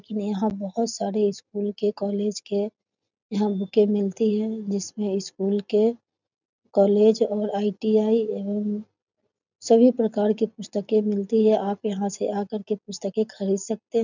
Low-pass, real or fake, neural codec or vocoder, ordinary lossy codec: 7.2 kHz; real; none; none